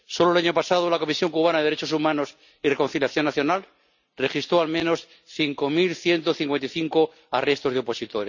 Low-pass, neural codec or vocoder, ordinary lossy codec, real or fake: 7.2 kHz; none; none; real